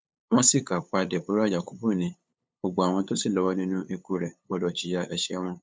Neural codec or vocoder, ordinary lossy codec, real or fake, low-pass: codec, 16 kHz, 8 kbps, FunCodec, trained on LibriTTS, 25 frames a second; none; fake; none